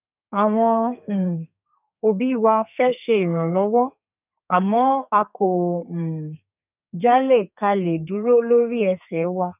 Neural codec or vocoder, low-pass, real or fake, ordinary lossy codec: codec, 32 kHz, 1.9 kbps, SNAC; 3.6 kHz; fake; none